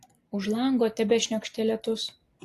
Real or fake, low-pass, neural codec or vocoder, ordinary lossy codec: real; 14.4 kHz; none; AAC, 48 kbps